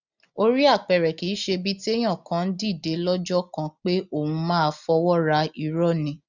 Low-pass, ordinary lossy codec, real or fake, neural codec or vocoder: 7.2 kHz; none; real; none